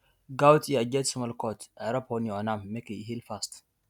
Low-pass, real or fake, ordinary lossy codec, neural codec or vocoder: 19.8 kHz; real; none; none